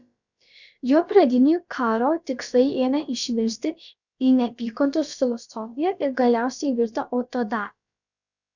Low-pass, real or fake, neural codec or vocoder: 7.2 kHz; fake; codec, 16 kHz, about 1 kbps, DyCAST, with the encoder's durations